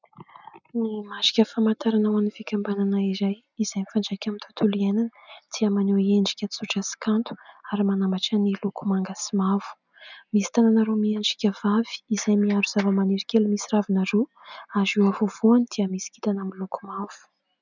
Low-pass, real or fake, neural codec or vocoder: 7.2 kHz; real; none